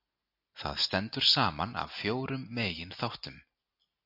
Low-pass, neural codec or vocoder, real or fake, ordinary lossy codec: 5.4 kHz; none; real; AAC, 48 kbps